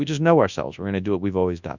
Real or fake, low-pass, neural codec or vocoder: fake; 7.2 kHz; codec, 24 kHz, 0.9 kbps, WavTokenizer, large speech release